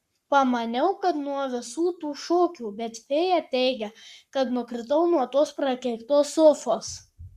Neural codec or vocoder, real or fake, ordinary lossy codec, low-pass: codec, 44.1 kHz, 7.8 kbps, Pupu-Codec; fake; Opus, 64 kbps; 14.4 kHz